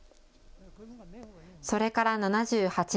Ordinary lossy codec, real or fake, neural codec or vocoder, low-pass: none; real; none; none